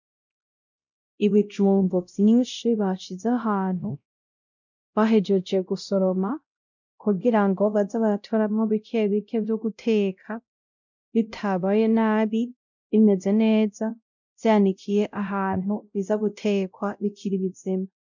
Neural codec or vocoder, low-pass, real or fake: codec, 16 kHz, 0.5 kbps, X-Codec, WavLM features, trained on Multilingual LibriSpeech; 7.2 kHz; fake